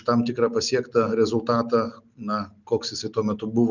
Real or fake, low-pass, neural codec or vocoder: real; 7.2 kHz; none